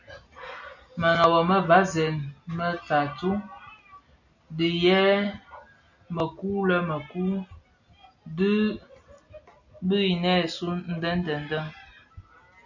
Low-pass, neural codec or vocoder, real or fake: 7.2 kHz; none; real